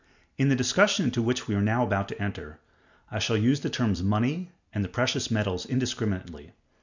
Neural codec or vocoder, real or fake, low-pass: none; real; 7.2 kHz